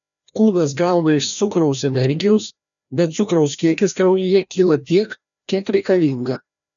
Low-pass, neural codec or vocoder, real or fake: 7.2 kHz; codec, 16 kHz, 1 kbps, FreqCodec, larger model; fake